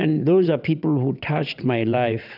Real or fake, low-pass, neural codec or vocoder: fake; 5.4 kHz; vocoder, 22.05 kHz, 80 mel bands, WaveNeXt